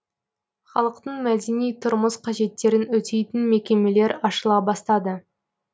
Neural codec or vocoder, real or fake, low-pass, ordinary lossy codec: none; real; none; none